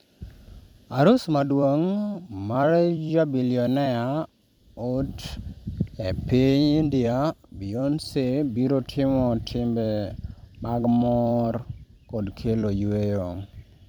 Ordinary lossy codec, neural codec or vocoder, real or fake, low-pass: MP3, 96 kbps; vocoder, 44.1 kHz, 128 mel bands every 256 samples, BigVGAN v2; fake; 19.8 kHz